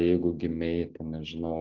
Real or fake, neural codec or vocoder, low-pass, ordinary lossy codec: real; none; 7.2 kHz; Opus, 32 kbps